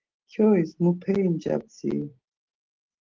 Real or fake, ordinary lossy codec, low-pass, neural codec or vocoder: real; Opus, 16 kbps; 7.2 kHz; none